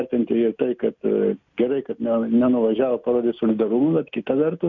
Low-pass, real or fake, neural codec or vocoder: 7.2 kHz; real; none